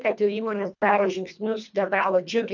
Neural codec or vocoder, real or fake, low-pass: codec, 24 kHz, 1.5 kbps, HILCodec; fake; 7.2 kHz